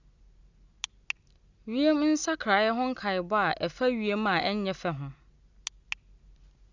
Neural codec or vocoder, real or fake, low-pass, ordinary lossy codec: none; real; 7.2 kHz; Opus, 64 kbps